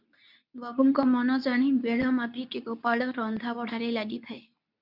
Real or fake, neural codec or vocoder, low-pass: fake; codec, 24 kHz, 0.9 kbps, WavTokenizer, medium speech release version 2; 5.4 kHz